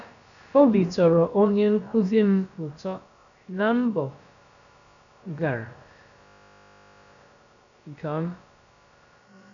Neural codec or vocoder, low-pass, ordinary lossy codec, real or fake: codec, 16 kHz, about 1 kbps, DyCAST, with the encoder's durations; 7.2 kHz; none; fake